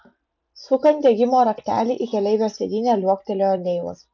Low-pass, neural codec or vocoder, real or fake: 7.2 kHz; none; real